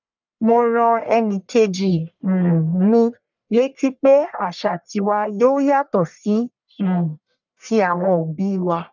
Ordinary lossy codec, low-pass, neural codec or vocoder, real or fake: none; 7.2 kHz; codec, 44.1 kHz, 1.7 kbps, Pupu-Codec; fake